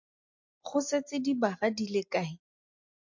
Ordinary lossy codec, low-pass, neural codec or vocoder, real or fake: MP3, 48 kbps; 7.2 kHz; none; real